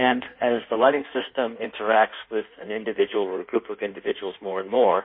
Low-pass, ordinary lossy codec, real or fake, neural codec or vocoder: 5.4 kHz; MP3, 24 kbps; fake; codec, 16 kHz in and 24 kHz out, 1.1 kbps, FireRedTTS-2 codec